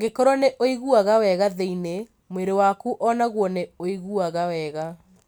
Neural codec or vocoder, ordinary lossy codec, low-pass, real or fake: none; none; none; real